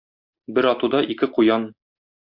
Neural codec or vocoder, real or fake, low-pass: none; real; 5.4 kHz